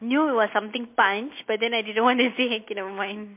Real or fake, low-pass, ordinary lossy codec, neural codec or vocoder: real; 3.6 kHz; MP3, 24 kbps; none